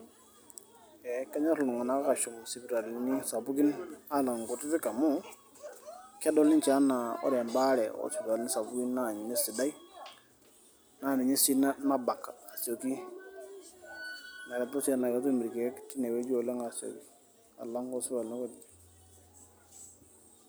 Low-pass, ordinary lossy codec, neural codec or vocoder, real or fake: none; none; none; real